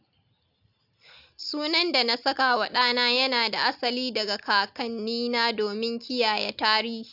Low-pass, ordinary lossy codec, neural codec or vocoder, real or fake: 5.4 kHz; AAC, 48 kbps; none; real